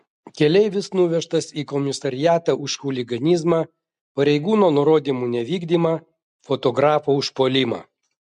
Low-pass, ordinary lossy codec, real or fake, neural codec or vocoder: 10.8 kHz; MP3, 64 kbps; real; none